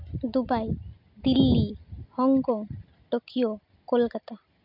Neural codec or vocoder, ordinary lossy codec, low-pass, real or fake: none; none; 5.4 kHz; real